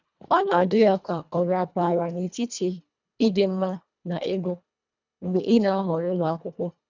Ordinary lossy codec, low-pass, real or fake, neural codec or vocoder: none; 7.2 kHz; fake; codec, 24 kHz, 1.5 kbps, HILCodec